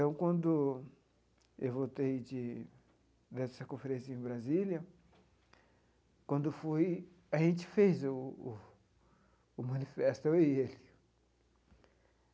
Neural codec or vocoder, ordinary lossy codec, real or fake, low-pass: none; none; real; none